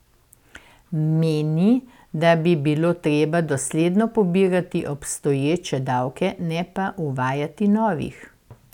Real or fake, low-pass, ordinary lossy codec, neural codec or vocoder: real; 19.8 kHz; none; none